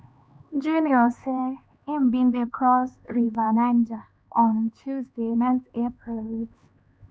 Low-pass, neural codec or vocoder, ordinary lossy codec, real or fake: none; codec, 16 kHz, 2 kbps, X-Codec, HuBERT features, trained on LibriSpeech; none; fake